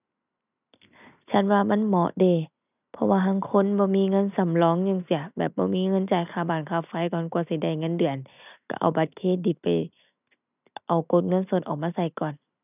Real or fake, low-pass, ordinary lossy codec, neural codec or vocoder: real; 3.6 kHz; none; none